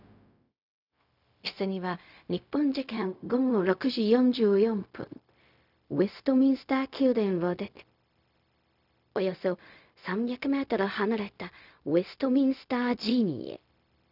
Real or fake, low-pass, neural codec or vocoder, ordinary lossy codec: fake; 5.4 kHz; codec, 16 kHz, 0.4 kbps, LongCat-Audio-Codec; none